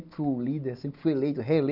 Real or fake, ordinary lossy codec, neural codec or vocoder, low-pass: real; none; none; 5.4 kHz